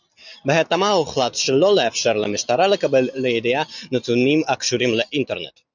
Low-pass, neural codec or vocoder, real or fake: 7.2 kHz; none; real